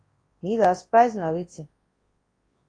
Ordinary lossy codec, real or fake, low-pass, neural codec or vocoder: AAC, 32 kbps; fake; 9.9 kHz; codec, 24 kHz, 0.9 kbps, WavTokenizer, large speech release